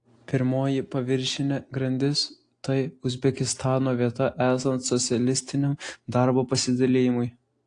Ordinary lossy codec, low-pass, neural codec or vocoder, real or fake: AAC, 48 kbps; 9.9 kHz; none; real